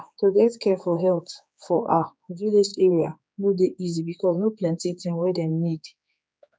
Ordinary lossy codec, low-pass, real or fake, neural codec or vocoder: none; none; fake; codec, 16 kHz, 4 kbps, X-Codec, HuBERT features, trained on general audio